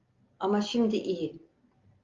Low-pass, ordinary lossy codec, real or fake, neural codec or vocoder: 7.2 kHz; Opus, 32 kbps; real; none